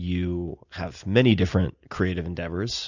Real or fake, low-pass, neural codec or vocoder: real; 7.2 kHz; none